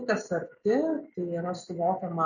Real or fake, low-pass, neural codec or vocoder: real; 7.2 kHz; none